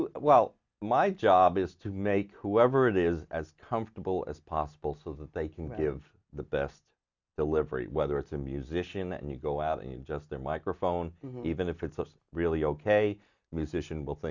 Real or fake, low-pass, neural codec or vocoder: real; 7.2 kHz; none